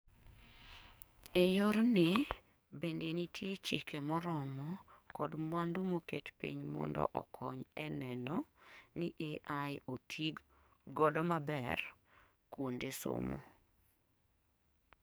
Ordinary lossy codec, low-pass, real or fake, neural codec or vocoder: none; none; fake; codec, 44.1 kHz, 2.6 kbps, SNAC